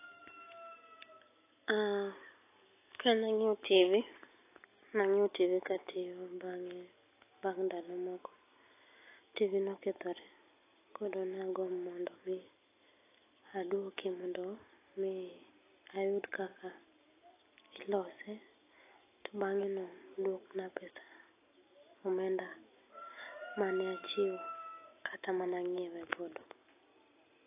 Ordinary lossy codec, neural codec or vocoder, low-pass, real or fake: AAC, 24 kbps; none; 3.6 kHz; real